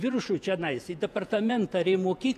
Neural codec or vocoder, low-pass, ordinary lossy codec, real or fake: vocoder, 48 kHz, 128 mel bands, Vocos; 14.4 kHz; MP3, 96 kbps; fake